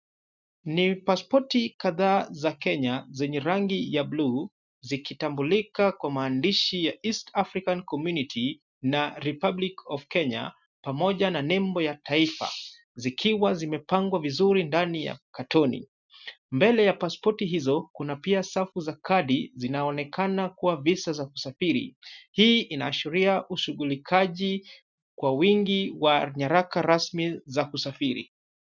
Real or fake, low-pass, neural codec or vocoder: real; 7.2 kHz; none